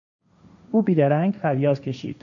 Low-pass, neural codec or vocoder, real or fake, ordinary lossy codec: 7.2 kHz; codec, 16 kHz, 1.1 kbps, Voila-Tokenizer; fake; MP3, 96 kbps